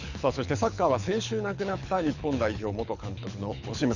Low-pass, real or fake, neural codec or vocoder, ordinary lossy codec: 7.2 kHz; fake; codec, 24 kHz, 6 kbps, HILCodec; none